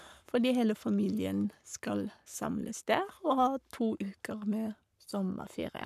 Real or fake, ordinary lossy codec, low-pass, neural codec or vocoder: fake; none; 14.4 kHz; codec, 44.1 kHz, 7.8 kbps, Pupu-Codec